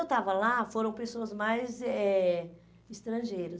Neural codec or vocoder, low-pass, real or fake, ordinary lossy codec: none; none; real; none